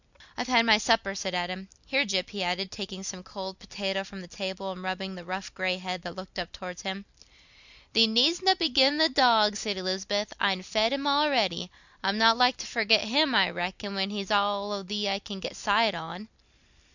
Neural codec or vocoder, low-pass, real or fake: none; 7.2 kHz; real